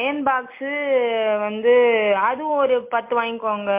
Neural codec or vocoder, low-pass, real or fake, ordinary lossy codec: none; 3.6 kHz; real; MP3, 24 kbps